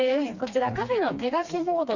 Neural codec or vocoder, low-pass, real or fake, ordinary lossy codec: codec, 16 kHz, 2 kbps, FreqCodec, smaller model; 7.2 kHz; fake; none